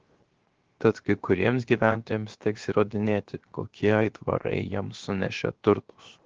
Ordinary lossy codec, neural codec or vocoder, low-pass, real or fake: Opus, 16 kbps; codec, 16 kHz, 0.7 kbps, FocalCodec; 7.2 kHz; fake